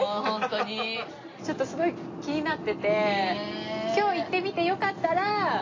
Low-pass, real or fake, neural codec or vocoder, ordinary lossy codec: 7.2 kHz; real; none; AAC, 32 kbps